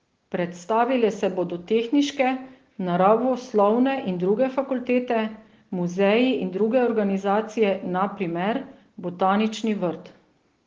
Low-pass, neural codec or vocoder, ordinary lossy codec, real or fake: 7.2 kHz; none; Opus, 16 kbps; real